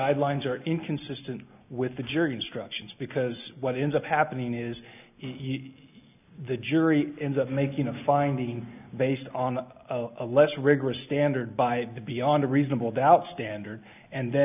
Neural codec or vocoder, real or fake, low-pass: none; real; 3.6 kHz